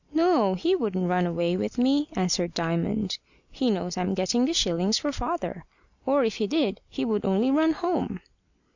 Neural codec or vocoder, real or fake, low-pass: none; real; 7.2 kHz